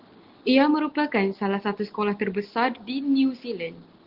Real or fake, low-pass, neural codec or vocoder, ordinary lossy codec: real; 5.4 kHz; none; Opus, 16 kbps